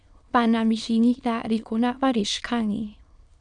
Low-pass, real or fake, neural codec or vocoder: 9.9 kHz; fake; autoencoder, 22.05 kHz, a latent of 192 numbers a frame, VITS, trained on many speakers